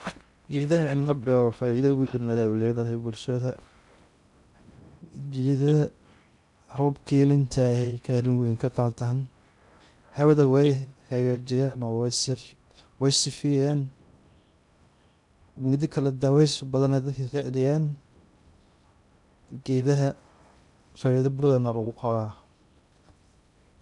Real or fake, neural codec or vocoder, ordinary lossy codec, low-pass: fake; codec, 16 kHz in and 24 kHz out, 0.6 kbps, FocalCodec, streaming, 2048 codes; none; 10.8 kHz